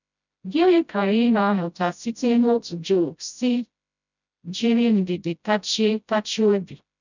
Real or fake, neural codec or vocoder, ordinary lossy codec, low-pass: fake; codec, 16 kHz, 0.5 kbps, FreqCodec, smaller model; none; 7.2 kHz